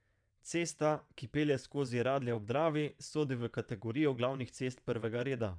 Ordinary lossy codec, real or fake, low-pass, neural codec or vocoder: none; fake; 9.9 kHz; vocoder, 22.05 kHz, 80 mel bands, WaveNeXt